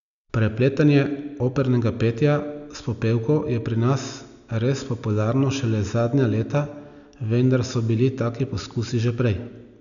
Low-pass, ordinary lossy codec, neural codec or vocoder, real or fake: 7.2 kHz; none; none; real